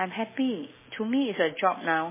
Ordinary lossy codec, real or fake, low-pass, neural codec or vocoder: MP3, 16 kbps; real; 3.6 kHz; none